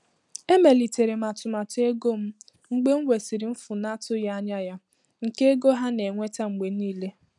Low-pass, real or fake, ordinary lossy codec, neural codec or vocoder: 10.8 kHz; real; none; none